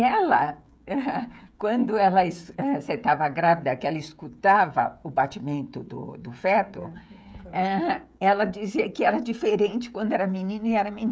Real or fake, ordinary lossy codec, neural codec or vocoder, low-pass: fake; none; codec, 16 kHz, 16 kbps, FreqCodec, smaller model; none